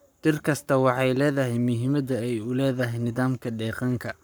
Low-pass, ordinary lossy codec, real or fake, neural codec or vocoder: none; none; fake; codec, 44.1 kHz, 7.8 kbps, Pupu-Codec